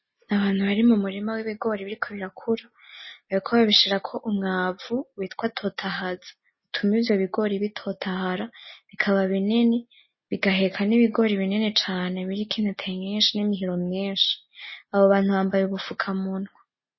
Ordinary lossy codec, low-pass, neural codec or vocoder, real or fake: MP3, 24 kbps; 7.2 kHz; none; real